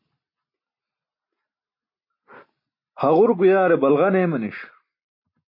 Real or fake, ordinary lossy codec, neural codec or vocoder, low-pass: real; MP3, 32 kbps; none; 5.4 kHz